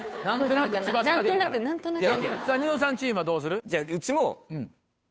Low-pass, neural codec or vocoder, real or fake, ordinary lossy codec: none; codec, 16 kHz, 2 kbps, FunCodec, trained on Chinese and English, 25 frames a second; fake; none